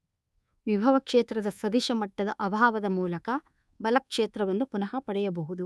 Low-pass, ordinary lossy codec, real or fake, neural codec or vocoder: none; none; fake; codec, 24 kHz, 1.2 kbps, DualCodec